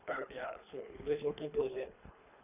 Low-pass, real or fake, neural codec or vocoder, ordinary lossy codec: 3.6 kHz; fake; codec, 24 kHz, 3 kbps, HILCodec; none